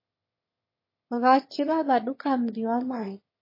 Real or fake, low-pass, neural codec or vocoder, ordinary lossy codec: fake; 5.4 kHz; autoencoder, 22.05 kHz, a latent of 192 numbers a frame, VITS, trained on one speaker; MP3, 24 kbps